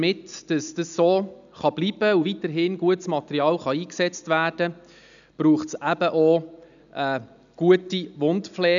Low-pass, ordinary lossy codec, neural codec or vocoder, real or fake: 7.2 kHz; none; none; real